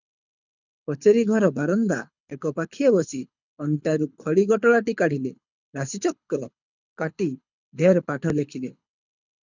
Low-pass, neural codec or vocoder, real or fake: 7.2 kHz; codec, 24 kHz, 6 kbps, HILCodec; fake